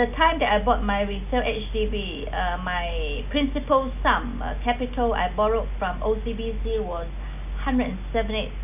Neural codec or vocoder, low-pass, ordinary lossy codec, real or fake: none; 3.6 kHz; none; real